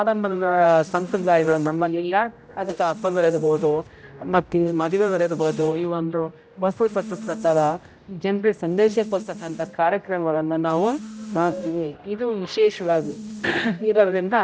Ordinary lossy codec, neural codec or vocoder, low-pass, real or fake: none; codec, 16 kHz, 0.5 kbps, X-Codec, HuBERT features, trained on general audio; none; fake